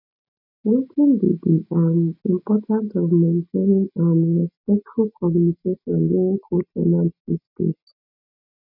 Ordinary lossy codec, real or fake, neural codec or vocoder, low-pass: none; real; none; 5.4 kHz